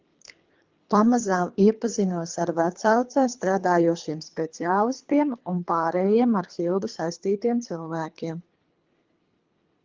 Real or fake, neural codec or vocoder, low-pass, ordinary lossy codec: fake; codec, 24 kHz, 3 kbps, HILCodec; 7.2 kHz; Opus, 32 kbps